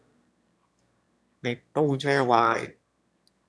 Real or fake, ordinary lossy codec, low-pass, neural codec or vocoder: fake; none; none; autoencoder, 22.05 kHz, a latent of 192 numbers a frame, VITS, trained on one speaker